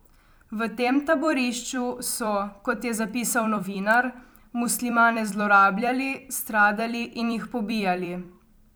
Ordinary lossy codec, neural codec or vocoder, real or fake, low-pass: none; vocoder, 44.1 kHz, 128 mel bands every 256 samples, BigVGAN v2; fake; none